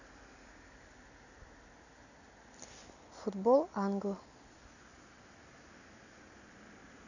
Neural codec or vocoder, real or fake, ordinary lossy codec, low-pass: none; real; none; 7.2 kHz